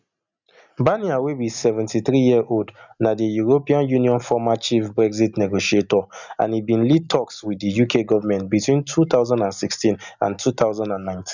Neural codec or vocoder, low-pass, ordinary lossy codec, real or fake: none; 7.2 kHz; none; real